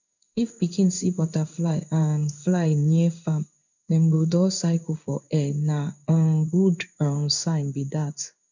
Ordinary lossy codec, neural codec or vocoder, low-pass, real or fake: none; codec, 16 kHz in and 24 kHz out, 1 kbps, XY-Tokenizer; 7.2 kHz; fake